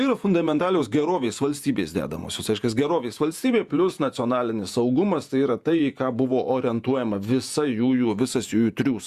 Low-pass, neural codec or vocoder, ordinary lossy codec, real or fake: 14.4 kHz; vocoder, 48 kHz, 128 mel bands, Vocos; Opus, 64 kbps; fake